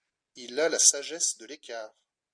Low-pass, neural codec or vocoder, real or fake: 9.9 kHz; none; real